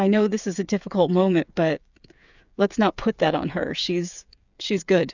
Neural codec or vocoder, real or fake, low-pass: codec, 16 kHz, 8 kbps, FreqCodec, smaller model; fake; 7.2 kHz